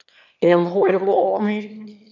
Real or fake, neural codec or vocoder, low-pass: fake; autoencoder, 22.05 kHz, a latent of 192 numbers a frame, VITS, trained on one speaker; 7.2 kHz